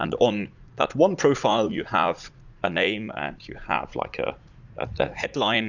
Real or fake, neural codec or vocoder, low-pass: fake; vocoder, 44.1 kHz, 80 mel bands, Vocos; 7.2 kHz